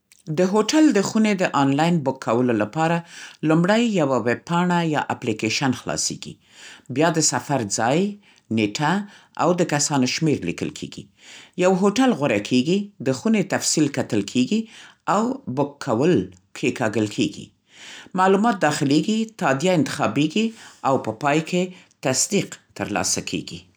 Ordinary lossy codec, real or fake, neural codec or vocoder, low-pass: none; real; none; none